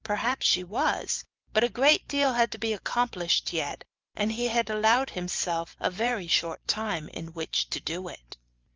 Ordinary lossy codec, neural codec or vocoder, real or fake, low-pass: Opus, 32 kbps; vocoder, 44.1 kHz, 128 mel bands every 512 samples, BigVGAN v2; fake; 7.2 kHz